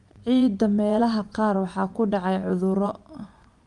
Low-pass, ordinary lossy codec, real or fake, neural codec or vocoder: 10.8 kHz; Opus, 32 kbps; fake; vocoder, 24 kHz, 100 mel bands, Vocos